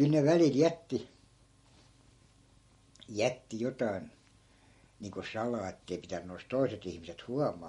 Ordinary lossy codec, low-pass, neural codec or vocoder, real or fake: MP3, 48 kbps; 19.8 kHz; none; real